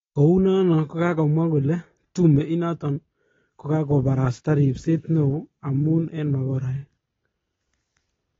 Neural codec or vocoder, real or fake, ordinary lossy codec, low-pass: none; real; AAC, 24 kbps; 7.2 kHz